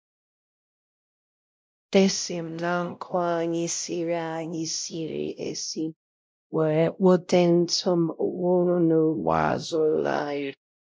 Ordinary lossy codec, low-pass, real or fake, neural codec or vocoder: none; none; fake; codec, 16 kHz, 0.5 kbps, X-Codec, WavLM features, trained on Multilingual LibriSpeech